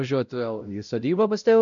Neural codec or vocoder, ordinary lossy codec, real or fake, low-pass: codec, 16 kHz, 0.5 kbps, X-Codec, WavLM features, trained on Multilingual LibriSpeech; MP3, 96 kbps; fake; 7.2 kHz